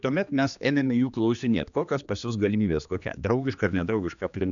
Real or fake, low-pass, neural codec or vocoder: fake; 7.2 kHz; codec, 16 kHz, 2 kbps, X-Codec, HuBERT features, trained on general audio